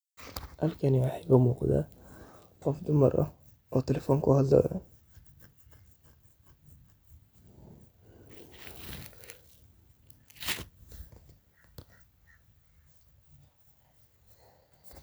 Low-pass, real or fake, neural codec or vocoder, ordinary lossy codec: none; real; none; none